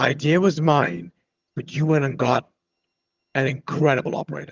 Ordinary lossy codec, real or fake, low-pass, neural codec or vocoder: Opus, 32 kbps; fake; 7.2 kHz; vocoder, 22.05 kHz, 80 mel bands, HiFi-GAN